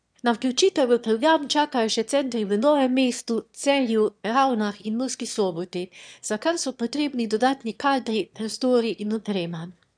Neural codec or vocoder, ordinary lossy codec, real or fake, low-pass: autoencoder, 22.05 kHz, a latent of 192 numbers a frame, VITS, trained on one speaker; none; fake; 9.9 kHz